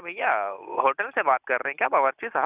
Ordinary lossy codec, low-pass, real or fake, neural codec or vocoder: Opus, 64 kbps; 3.6 kHz; real; none